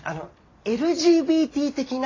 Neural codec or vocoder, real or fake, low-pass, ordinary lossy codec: none; real; 7.2 kHz; AAC, 32 kbps